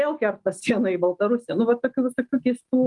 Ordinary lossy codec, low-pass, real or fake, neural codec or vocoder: Opus, 32 kbps; 10.8 kHz; real; none